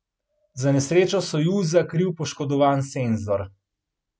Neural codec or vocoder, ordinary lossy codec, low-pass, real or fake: none; none; none; real